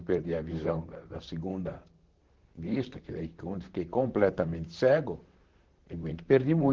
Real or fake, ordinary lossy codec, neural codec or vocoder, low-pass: fake; Opus, 16 kbps; vocoder, 44.1 kHz, 128 mel bands, Pupu-Vocoder; 7.2 kHz